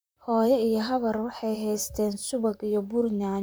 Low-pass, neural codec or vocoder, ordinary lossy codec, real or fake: none; vocoder, 44.1 kHz, 128 mel bands, Pupu-Vocoder; none; fake